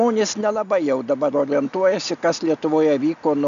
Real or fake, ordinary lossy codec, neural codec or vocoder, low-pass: real; MP3, 96 kbps; none; 7.2 kHz